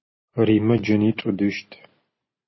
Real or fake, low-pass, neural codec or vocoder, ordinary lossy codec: real; 7.2 kHz; none; MP3, 24 kbps